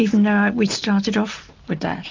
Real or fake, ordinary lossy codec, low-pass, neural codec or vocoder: real; MP3, 64 kbps; 7.2 kHz; none